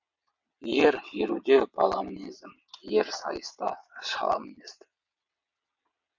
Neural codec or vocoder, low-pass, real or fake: vocoder, 22.05 kHz, 80 mel bands, WaveNeXt; 7.2 kHz; fake